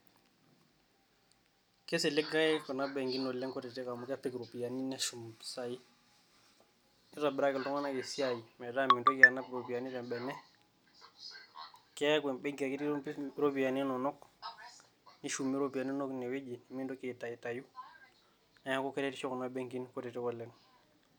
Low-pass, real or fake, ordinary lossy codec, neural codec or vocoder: none; real; none; none